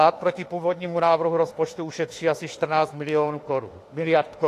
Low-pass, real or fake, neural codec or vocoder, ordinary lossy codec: 14.4 kHz; fake; autoencoder, 48 kHz, 32 numbers a frame, DAC-VAE, trained on Japanese speech; AAC, 48 kbps